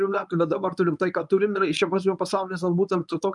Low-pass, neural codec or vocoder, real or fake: 10.8 kHz; codec, 24 kHz, 0.9 kbps, WavTokenizer, medium speech release version 1; fake